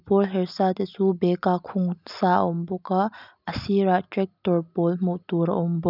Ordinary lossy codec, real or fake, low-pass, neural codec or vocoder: none; real; 5.4 kHz; none